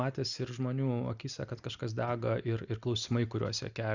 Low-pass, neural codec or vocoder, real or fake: 7.2 kHz; none; real